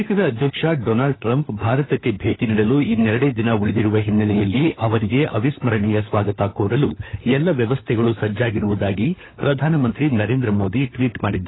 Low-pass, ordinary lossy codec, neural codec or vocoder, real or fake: 7.2 kHz; AAC, 16 kbps; codec, 16 kHz, 4 kbps, FunCodec, trained on LibriTTS, 50 frames a second; fake